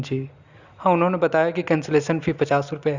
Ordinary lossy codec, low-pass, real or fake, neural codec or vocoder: Opus, 64 kbps; 7.2 kHz; real; none